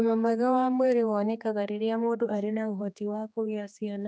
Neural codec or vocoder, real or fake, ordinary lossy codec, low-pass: codec, 16 kHz, 2 kbps, X-Codec, HuBERT features, trained on general audio; fake; none; none